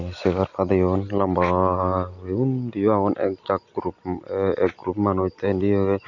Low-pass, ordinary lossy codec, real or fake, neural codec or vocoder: 7.2 kHz; none; real; none